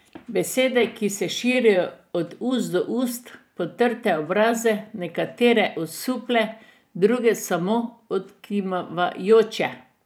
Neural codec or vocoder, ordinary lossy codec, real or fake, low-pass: vocoder, 44.1 kHz, 128 mel bands every 512 samples, BigVGAN v2; none; fake; none